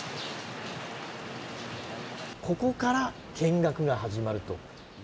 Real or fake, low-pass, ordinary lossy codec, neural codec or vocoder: real; none; none; none